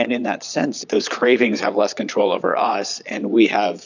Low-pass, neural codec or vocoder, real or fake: 7.2 kHz; vocoder, 22.05 kHz, 80 mel bands, Vocos; fake